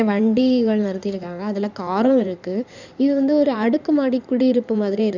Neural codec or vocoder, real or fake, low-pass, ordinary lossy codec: codec, 16 kHz in and 24 kHz out, 2.2 kbps, FireRedTTS-2 codec; fake; 7.2 kHz; none